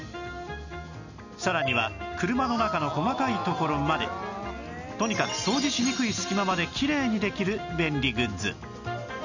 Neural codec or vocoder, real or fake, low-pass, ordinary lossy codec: none; real; 7.2 kHz; none